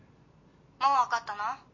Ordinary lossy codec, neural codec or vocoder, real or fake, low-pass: MP3, 32 kbps; none; real; 7.2 kHz